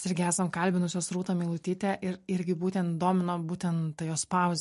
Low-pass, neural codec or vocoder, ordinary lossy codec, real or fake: 14.4 kHz; none; MP3, 48 kbps; real